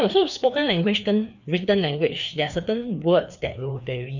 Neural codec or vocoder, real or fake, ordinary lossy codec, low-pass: codec, 16 kHz, 2 kbps, FreqCodec, larger model; fake; none; 7.2 kHz